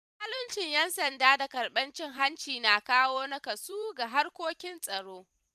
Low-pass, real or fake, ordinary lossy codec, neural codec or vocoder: 14.4 kHz; fake; none; vocoder, 44.1 kHz, 128 mel bands every 256 samples, BigVGAN v2